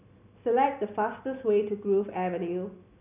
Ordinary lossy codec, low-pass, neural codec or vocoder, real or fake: none; 3.6 kHz; none; real